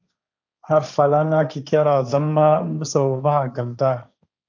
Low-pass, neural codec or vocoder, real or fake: 7.2 kHz; codec, 16 kHz, 1.1 kbps, Voila-Tokenizer; fake